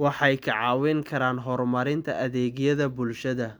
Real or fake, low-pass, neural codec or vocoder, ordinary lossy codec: real; none; none; none